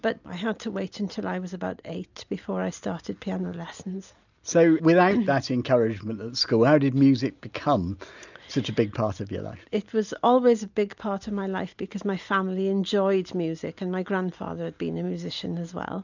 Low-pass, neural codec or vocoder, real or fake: 7.2 kHz; none; real